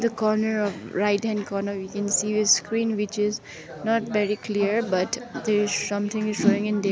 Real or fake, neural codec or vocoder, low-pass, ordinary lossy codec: real; none; none; none